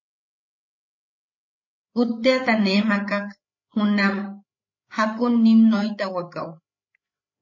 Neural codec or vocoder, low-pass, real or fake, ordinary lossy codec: codec, 16 kHz, 8 kbps, FreqCodec, larger model; 7.2 kHz; fake; MP3, 32 kbps